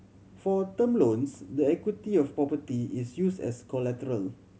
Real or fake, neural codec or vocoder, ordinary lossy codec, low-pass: real; none; none; none